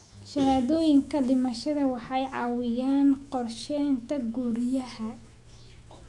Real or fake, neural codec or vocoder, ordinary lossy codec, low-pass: fake; autoencoder, 48 kHz, 128 numbers a frame, DAC-VAE, trained on Japanese speech; none; 10.8 kHz